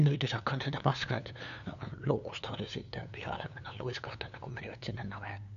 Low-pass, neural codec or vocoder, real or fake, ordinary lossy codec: 7.2 kHz; codec, 16 kHz, 2 kbps, FunCodec, trained on LibriTTS, 25 frames a second; fake; none